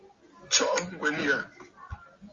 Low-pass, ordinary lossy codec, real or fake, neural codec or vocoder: 7.2 kHz; Opus, 32 kbps; real; none